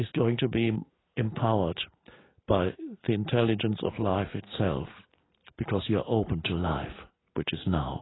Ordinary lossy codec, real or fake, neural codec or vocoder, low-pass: AAC, 16 kbps; real; none; 7.2 kHz